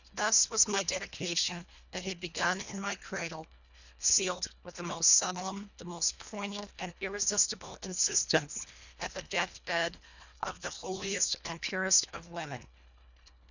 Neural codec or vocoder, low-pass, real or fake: codec, 24 kHz, 1.5 kbps, HILCodec; 7.2 kHz; fake